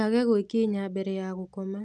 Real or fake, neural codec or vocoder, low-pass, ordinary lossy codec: real; none; none; none